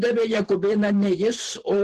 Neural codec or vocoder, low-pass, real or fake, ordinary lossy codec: vocoder, 44.1 kHz, 128 mel bands every 512 samples, BigVGAN v2; 14.4 kHz; fake; Opus, 16 kbps